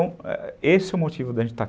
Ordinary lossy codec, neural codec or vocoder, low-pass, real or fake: none; none; none; real